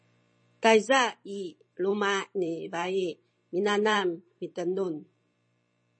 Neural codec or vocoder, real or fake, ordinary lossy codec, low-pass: vocoder, 44.1 kHz, 128 mel bands every 512 samples, BigVGAN v2; fake; MP3, 32 kbps; 9.9 kHz